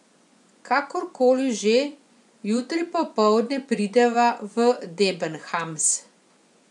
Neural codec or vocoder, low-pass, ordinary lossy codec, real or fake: vocoder, 24 kHz, 100 mel bands, Vocos; 10.8 kHz; none; fake